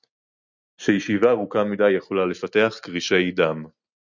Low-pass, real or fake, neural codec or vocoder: 7.2 kHz; real; none